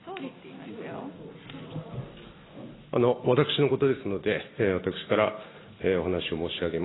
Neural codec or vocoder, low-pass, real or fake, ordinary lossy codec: none; 7.2 kHz; real; AAC, 16 kbps